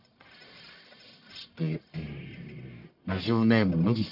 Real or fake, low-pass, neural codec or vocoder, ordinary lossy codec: fake; 5.4 kHz; codec, 44.1 kHz, 1.7 kbps, Pupu-Codec; none